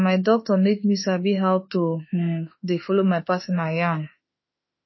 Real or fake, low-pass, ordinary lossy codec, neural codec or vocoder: fake; 7.2 kHz; MP3, 24 kbps; autoencoder, 48 kHz, 32 numbers a frame, DAC-VAE, trained on Japanese speech